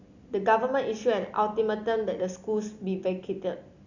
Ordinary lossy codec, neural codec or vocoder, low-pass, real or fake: none; none; 7.2 kHz; real